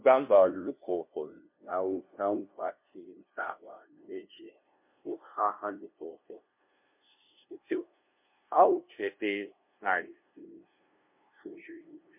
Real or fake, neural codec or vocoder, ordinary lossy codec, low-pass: fake; codec, 16 kHz, 0.5 kbps, FunCodec, trained on LibriTTS, 25 frames a second; MP3, 32 kbps; 3.6 kHz